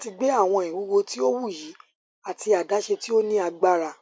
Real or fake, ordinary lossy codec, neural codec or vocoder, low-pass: real; none; none; none